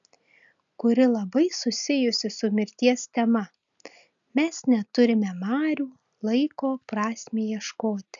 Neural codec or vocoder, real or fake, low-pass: none; real; 7.2 kHz